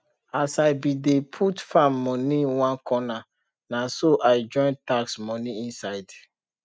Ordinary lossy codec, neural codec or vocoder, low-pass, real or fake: none; none; none; real